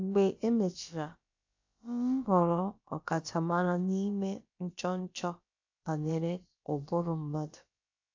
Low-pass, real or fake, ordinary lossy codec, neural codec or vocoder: 7.2 kHz; fake; AAC, 48 kbps; codec, 16 kHz, about 1 kbps, DyCAST, with the encoder's durations